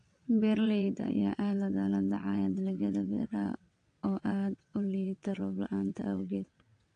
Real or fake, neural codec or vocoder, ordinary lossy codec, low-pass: fake; vocoder, 22.05 kHz, 80 mel bands, WaveNeXt; AAC, 48 kbps; 9.9 kHz